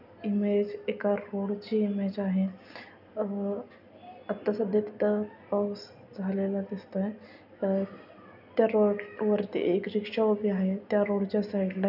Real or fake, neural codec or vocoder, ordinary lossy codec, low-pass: real; none; none; 5.4 kHz